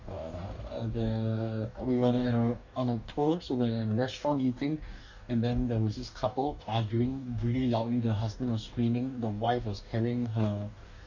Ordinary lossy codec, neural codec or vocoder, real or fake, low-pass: none; codec, 44.1 kHz, 2.6 kbps, DAC; fake; 7.2 kHz